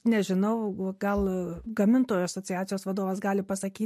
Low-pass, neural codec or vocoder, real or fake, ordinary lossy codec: 14.4 kHz; none; real; MP3, 64 kbps